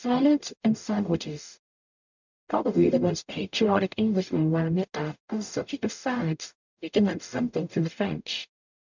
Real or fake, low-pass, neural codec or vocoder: fake; 7.2 kHz; codec, 44.1 kHz, 0.9 kbps, DAC